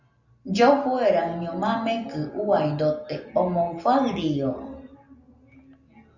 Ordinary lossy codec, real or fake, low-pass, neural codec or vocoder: Opus, 64 kbps; real; 7.2 kHz; none